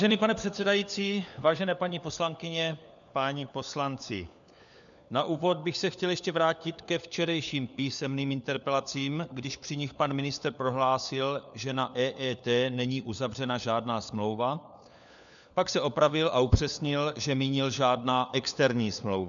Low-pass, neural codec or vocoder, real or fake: 7.2 kHz; codec, 16 kHz, 4 kbps, FunCodec, trained on LibriTTS, 50 frames a second; fake